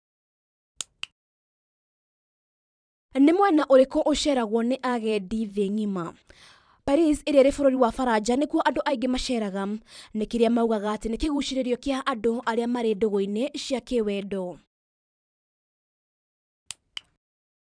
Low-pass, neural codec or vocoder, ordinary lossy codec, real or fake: 9.9 kHz; vocoder, 44.1 kHz, 128 mel bands every 512 samples, BigVGAN v2; none; fake